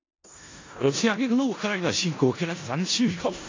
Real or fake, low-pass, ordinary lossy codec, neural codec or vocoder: fake; 7.2 kHz; AAC, 32 kbps; codec, 16 kHz in and 24 kHz out, 0.4 kbps, LongCat-Audio-Codec, four codebook decoder